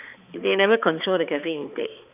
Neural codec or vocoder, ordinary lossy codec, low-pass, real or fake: codec, 16 kHz, 4 kbps, X-Codec, HuBERT features, trained on balanced general audio; none; 3.6 kHz; fake